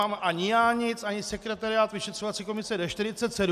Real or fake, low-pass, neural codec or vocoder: real; 14.4 kHz; none